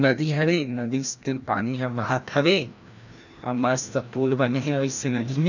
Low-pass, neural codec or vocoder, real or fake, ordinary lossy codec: 7.2 kHz; codec, 16 kHz, 1 kbps, FreqCodec, larger model; fake; none